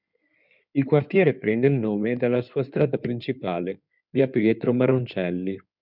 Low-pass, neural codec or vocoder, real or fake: 5.4 kHz; codec, 16 kHz in and 24 kHz out, 2.2 kbps, FireRedTTS-2 codec; fake